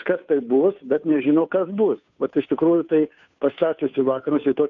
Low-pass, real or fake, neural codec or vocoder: 7.2 kHz; fake; codec, 16 kHz, 2 kbps, FunCodec, trained on Chinese and English, 25 frames a second